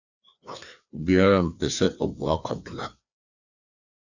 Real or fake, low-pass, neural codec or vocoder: fake; 7.2 kHz; codec, 16 kHz, 2 kbps, FreqCodec, larger model